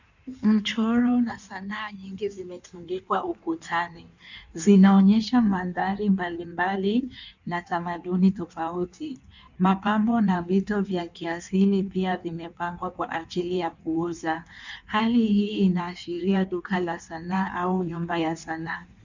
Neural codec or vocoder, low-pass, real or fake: codec, 16 kHz in and 24 kHz out, 1.1 kbps, FireRedTTS-2 codec; 7.2 kHz; fake